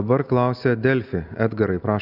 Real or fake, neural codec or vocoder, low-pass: real; none; 5.4 kHz